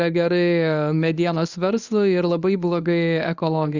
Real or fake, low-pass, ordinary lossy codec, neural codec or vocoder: fake; 7.2 kHz; Opus, 64 kbps; codec, 24 kHz, 0.9 kbps, WavTokenizer, medium speech release version 1